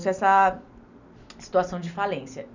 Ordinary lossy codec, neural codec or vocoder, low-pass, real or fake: none; none; 7.2 kHz; real